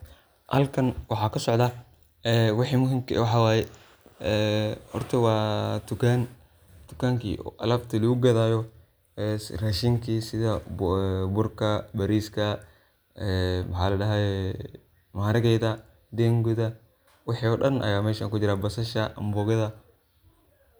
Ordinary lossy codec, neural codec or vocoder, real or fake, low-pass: none; none; real; none